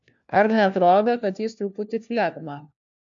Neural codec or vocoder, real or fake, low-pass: codec, 16 kHz, 1 kbps, FunCodec, trained on LibriTTS, 50 frames a second; fake; 7.2 kHz